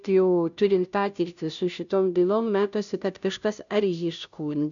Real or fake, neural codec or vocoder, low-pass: fake; codec, 16 kHz, 0.5 kbps, FunCodec, trained on Chinese and English, 25 frames a second; 7.2 kHz